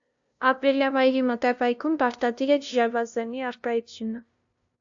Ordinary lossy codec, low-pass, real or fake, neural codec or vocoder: AAC, 64 kbps; 7.2 kHz; fake; codec, 16 kHz, 0.5 kbps, FunCodec, trained on LibriTTS, 25 frames a second